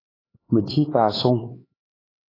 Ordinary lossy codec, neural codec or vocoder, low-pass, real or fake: AAC, 24 kbps; codec, 16 kHz, 4 kbps, X-Codec, HuBERT features, trained on LibriSpeech; 5.4 kHz; fake